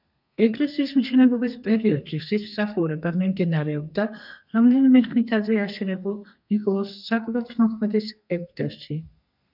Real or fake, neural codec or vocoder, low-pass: fake; codec, 32 kHz, 1.9 kbps, SNAC; 5.4 kHz